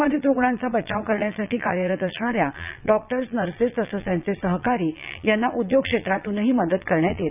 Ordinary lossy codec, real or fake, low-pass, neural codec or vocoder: none; fake; 3.6 kHz; vocoder, 22.05 kHz, 80 mel bands, Vocos